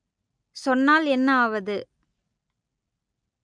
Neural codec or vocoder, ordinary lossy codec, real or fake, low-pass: none; none; real; 9.9 kHz